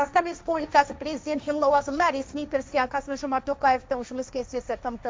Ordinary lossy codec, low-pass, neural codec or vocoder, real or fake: none; none; codec, 16 kHz, 1.1 kbps, Voila-Tokenizer; fake